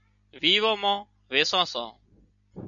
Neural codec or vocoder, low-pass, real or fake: none; 7.2 kHz; real